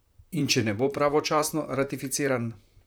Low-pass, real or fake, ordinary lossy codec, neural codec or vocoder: none; fake; none; vocoder, 44.1 kHz, 128 mel bands, Pupu-Vocoder